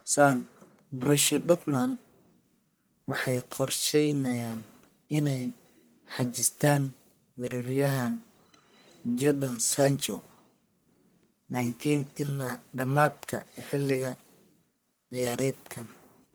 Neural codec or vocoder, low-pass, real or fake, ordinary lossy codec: codec, 44.1 kHz, 1.7 kbps, Pupu-Codec; none; fake; none